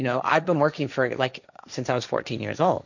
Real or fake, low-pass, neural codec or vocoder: fake; 7.2 kHz; codec, 16 kHz, 1.1 kbps, Voila-Tokenizer